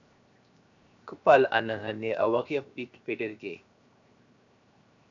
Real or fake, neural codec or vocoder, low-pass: fake; codec, 16 kHz, 0.7 kbps, FocalCodec; 7.2 kHz